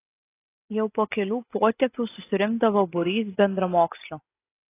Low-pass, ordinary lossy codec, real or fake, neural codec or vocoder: 3.6 kHz; AAC, 24 kbps; real; none